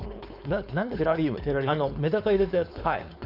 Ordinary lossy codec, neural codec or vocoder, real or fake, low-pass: none; codec, 16 kHz, 4.8 kbps, FACodec; fake; 5.4 kHz